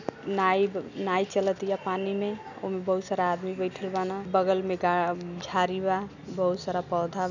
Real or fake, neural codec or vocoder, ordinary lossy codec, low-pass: real; none; none; 7.2 kHz